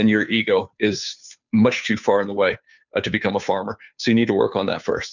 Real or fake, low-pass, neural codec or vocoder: fake; 7.2 kHz; codec, 16 kHz, 2 kbps, FunCodec, trained on Chinese and English, 25 frames a second